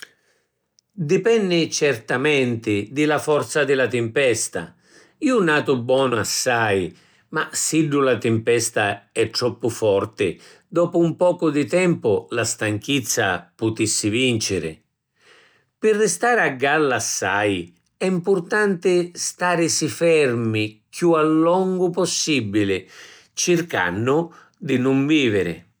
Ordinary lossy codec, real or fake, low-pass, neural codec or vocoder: none; real; none; none